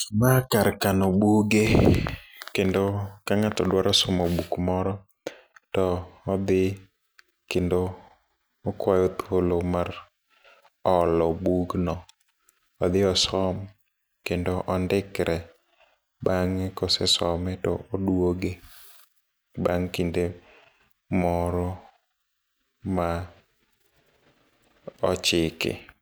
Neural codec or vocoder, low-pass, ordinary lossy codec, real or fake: none; none; none; real